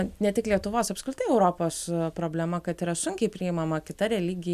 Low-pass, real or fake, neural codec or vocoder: 14.4 kHz; real; none